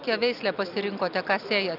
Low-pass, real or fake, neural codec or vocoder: 5.4 kHz; real; none